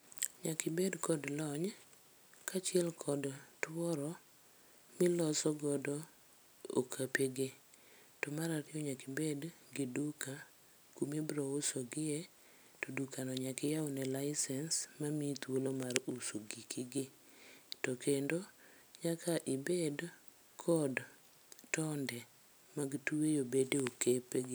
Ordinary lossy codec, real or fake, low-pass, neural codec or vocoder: none; real; none; none